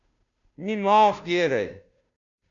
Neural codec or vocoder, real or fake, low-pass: codec, 16 kHz, 0.5 kbps, FunCodec, trained on Chinese and English, 25 frames a second; fake; 7.2 kHz